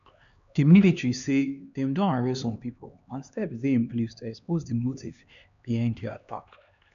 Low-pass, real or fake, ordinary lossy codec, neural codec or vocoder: 7.2 kHz; fake; none; codec, 16 kHz, 2 kbps, X-Codec, HuBERT features, trained on LibriSpeech